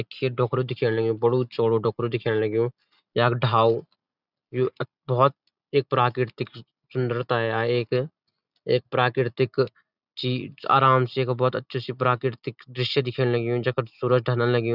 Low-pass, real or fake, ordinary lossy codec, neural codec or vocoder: 5.4 kHz; real; none; none